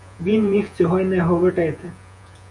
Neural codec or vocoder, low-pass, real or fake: vocoder, 48 kHz, 128 mel bands, Vocos; 10.8 kHz; fake